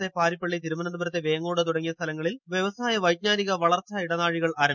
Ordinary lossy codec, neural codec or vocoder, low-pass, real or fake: MP3, 64 kbps; none; 7.2 kHz; real